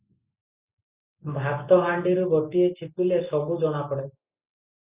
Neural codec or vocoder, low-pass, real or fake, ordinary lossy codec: none; 3.6 kHz; real; Opus, 64 kbps